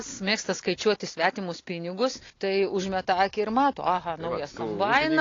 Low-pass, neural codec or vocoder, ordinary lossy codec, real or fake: 7.2 kHz; none; AAC, 32 kbps; real